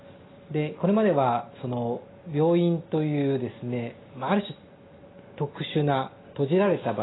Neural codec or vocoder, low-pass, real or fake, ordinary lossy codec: none; 7.2 kHz; real; AAC, 16 kbps